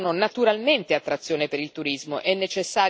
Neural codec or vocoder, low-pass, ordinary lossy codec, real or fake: none; none; none; real